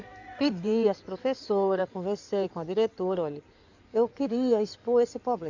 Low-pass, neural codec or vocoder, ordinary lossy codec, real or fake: 7.2 kHz; codec, 16 kHz in and 24 kHz out, 2.2 kbps, FireRedTTS-2 codec; none; fake